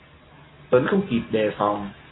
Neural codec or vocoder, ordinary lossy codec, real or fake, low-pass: none; AAC, 16 kbps; real; 7.2 kHz